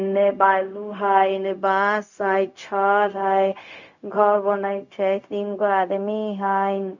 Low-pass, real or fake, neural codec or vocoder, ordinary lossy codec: 7.2 kHz; fake; codec, 16 kHz, 0.4 kbps, LongCat-Audio-Codec; none